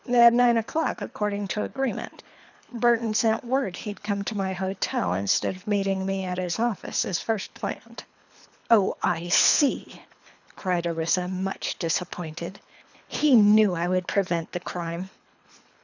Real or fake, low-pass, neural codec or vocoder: fake; 7.2 kHz; codec, 24 kHz, 3 kbps, HILCodec